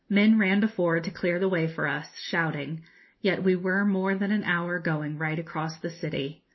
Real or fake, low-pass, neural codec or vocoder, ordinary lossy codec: real; 7.2 kHz; none; MP3, 24 kbps